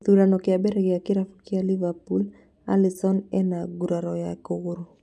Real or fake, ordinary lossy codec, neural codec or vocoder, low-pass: real; none; none; none